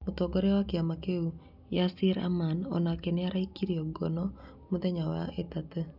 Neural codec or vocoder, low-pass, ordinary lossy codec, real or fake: none; 5.4 kHz; none; real